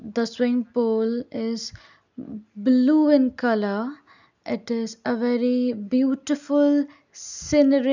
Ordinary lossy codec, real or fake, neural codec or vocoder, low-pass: none; real; none; 7.2 kHz